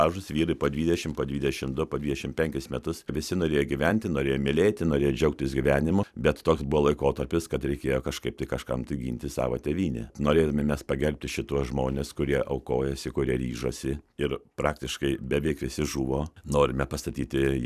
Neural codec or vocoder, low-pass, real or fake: vocoder, 44.1 kHz, 128 mel bands every 256 samples, BigVGAN v2; 14.4 kHz; fake